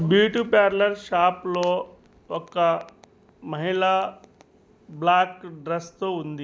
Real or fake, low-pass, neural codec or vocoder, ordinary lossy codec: real; none; none; none